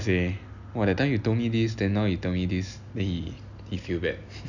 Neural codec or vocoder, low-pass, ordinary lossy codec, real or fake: none; 7.2 kHz; none; real